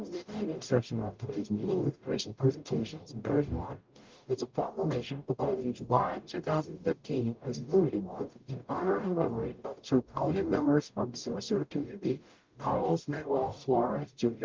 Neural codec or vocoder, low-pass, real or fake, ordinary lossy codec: codec, 44.1 kHz, 0.9 kbps, DAC; 7.2 kHz; fake; Opus, 24 kbps